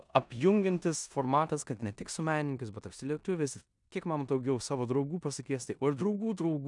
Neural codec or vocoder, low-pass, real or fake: codec, 16 kHz in and 24 kHz out, 0.9 kbps, LongCat-Audio-Codec, four codebook decoder; 10.8 kHz; fake